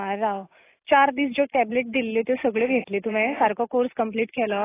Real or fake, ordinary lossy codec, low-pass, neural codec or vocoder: real; AAC, 16 kbps; 3.6 kHz; none